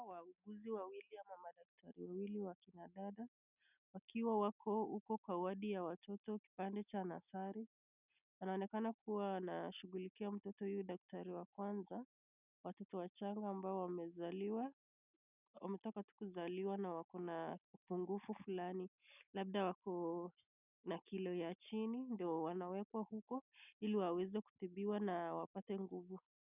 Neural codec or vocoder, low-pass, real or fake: none; 3.6 kHz; real